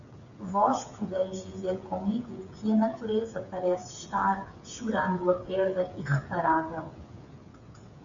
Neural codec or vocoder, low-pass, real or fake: codec, 16 kHz, 8 kbps, FreqCodec, smaller model; 7.2 kHz; fake